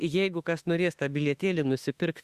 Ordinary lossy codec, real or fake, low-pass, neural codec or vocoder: Opus, 64 kbps; fake; 14.4 kHz; autoencoder, 48 kHz, 32 numbers a frame, DAC-VAE, trained on Japanese speech